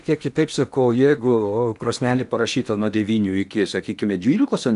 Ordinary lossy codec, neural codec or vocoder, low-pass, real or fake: MP3, 96 kbps; codec, 16 kHz in and 24 kHz out, 0.8 kbps, FocalCodec, streaming, 65536 codes; 10.8 kHz; fake